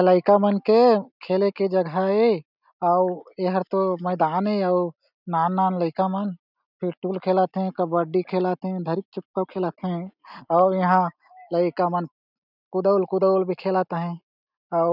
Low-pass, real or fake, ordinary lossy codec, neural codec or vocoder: 5.4 kHz; real; none; none